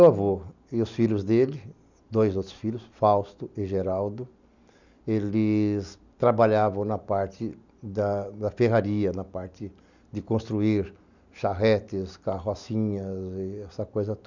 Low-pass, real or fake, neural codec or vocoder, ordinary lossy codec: 7.2 kHz; real; none; none